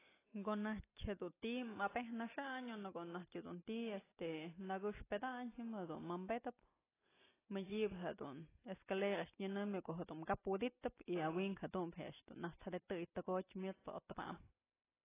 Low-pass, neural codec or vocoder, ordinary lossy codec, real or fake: 3.6 kHz; none; AAC, 16 kbps; real